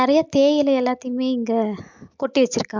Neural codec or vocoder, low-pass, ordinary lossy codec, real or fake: none; 7.2 kHz; MP3, 64 kbps; real